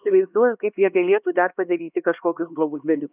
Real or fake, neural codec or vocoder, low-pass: fake; codec, 16 kHz, 2 kbps, X-Codec, HuBERT features, trained on LibriSpeech; 3.6 kHz